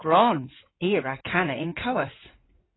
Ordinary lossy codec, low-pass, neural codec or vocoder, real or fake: AAC, 16 kbps; 7.2 kHz; codec, 16 kHz in and 24 kHz out, 2.2 kbps, FireRedTTS-2 codec; fake